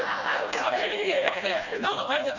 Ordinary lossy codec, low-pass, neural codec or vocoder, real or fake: none; 7.2 kHz; codec, 16 kHz, 1 kbps, FreqCodec, smaller model; fake